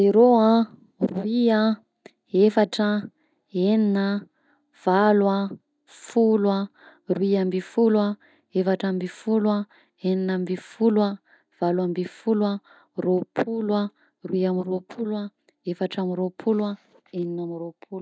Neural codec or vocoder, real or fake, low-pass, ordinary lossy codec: none; real; none; none